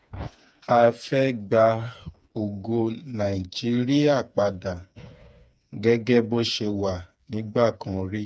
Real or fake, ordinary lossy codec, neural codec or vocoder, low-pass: fake; none; codec, 16 kHz, 4 kbps, FreqCodec, smaller model; none